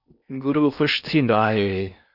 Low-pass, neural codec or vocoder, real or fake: 5.4 kHz; codec, 16 kHz in and 24 kHz out, 0.6 kbps, FocalCodec, streaming, 4096 codes; fake